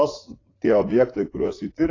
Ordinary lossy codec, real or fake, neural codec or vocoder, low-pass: AAC, 32 kbps; fake; vocoder, 44.1 kHz, 80 mel bands, Vocos; 7.2 kHz